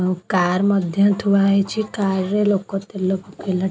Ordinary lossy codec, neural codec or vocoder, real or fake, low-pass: none; none; real; none